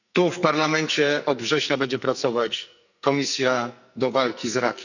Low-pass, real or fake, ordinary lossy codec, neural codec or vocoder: 7.2 kHz; fake; none; codec, 44.1 kHz, 2.6 kbps, SNAC